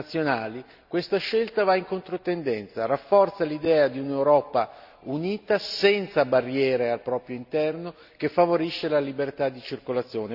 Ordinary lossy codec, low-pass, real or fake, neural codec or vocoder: none; 5.4 kHz; real; none